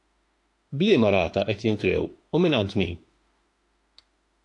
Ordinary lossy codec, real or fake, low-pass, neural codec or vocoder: AAC, 48 kbps; fake; 10.8 kHz; autoencoder, 48 kHz, 32 numbers a frame, DAC-VAE, trained on Japanese speech